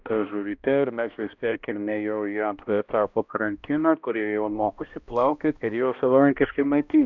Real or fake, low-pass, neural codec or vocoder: fake; 7.2 kHz; codec, 16 kHz, 1 kbps, X-Codec, HuBERT features, trained on balanced general audio